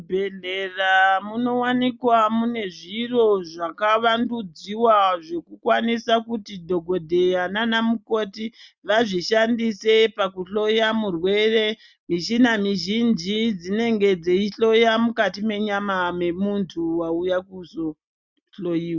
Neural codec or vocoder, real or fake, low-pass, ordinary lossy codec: none; real; 7.2 kHz; Opus, 64 kbps